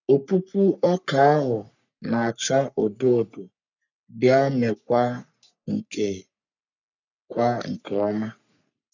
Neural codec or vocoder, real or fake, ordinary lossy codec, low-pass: codec, 44.1 kHz, 3.4 kbps, Pupu-Codec; fake; none; 7.2 kHz